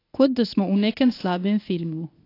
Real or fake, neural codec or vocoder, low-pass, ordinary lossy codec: fake; codec, 24 kHz, 0.9 kbps, WavTokenizer, medium speech release version 1; 5.4 kHz; AAC, 32 kbps